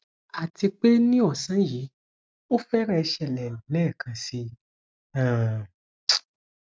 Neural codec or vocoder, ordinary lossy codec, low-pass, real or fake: none; none; none; real